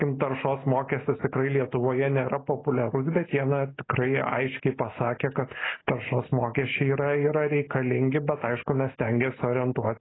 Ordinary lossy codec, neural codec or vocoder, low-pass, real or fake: AAC, 16 kbps; none; 7.2 kHz; real